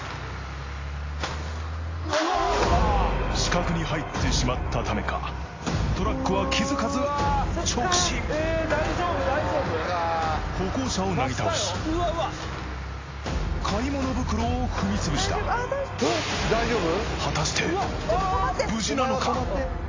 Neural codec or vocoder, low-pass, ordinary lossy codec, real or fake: none; 7.2 kHz; AAC, 32 kbps; real